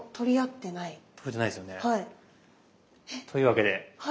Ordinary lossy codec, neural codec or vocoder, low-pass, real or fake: none; none; none; real